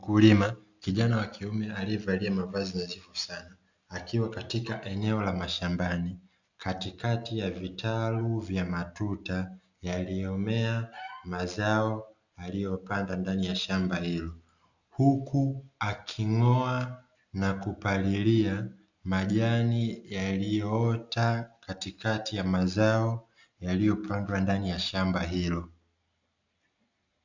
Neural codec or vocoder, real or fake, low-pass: none; real; 7.2 kHz